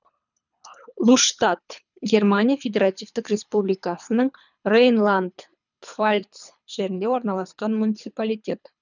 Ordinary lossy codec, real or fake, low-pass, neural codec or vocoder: none; fake; 7.2 kHz; codec, 24 kHz, 3 kbps, HILCodec